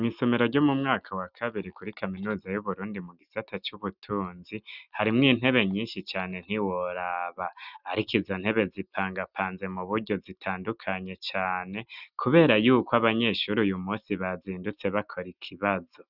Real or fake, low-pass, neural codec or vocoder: real; 5.4 kHz; none